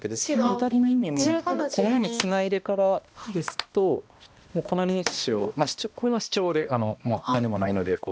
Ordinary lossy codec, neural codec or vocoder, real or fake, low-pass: none; codec, 16 kHz, 1 kbps, X-Codec, HuBERT features, trained on balanced general audio; fake; none